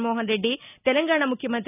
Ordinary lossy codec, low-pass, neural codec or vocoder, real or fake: none; 3.6 kHz; none; real